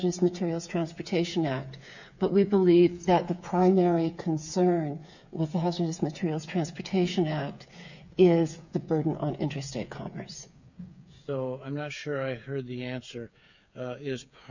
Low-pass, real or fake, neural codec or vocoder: 7.2 kHz; fake; codec, 16 kHz, 8 kbps, FreqCodec, smaller model